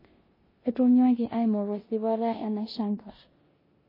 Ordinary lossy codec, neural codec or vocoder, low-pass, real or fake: MP3, 24 kbps; codec, 16 kHz in and 24 kHz out, 0.9 kbps, LongCat-Audio-Codec, four codebook decoder; 5.4 kHz; fake